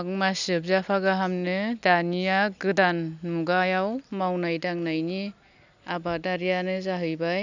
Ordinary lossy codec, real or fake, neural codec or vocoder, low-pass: none; real; none; 7.2 kHz